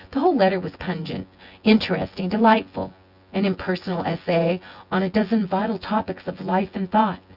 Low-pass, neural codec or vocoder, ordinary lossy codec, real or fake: 5.4 kHz; vocoder, 24 kHz, 100 mel bands, Vocos; Opus, 64 kbps; fake